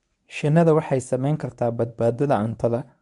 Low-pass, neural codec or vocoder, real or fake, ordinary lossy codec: 10.8 kHz; codec, 24 kHz, 0.9 kbps, WavTokenizer, medium speech release version 1; fake; none